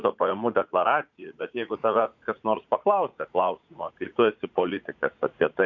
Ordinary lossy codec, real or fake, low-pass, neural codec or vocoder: MP3, 64 kbps; fake; 7.2 kHz; vocoder, 44.1 kHz, 80 mel bands, Vocos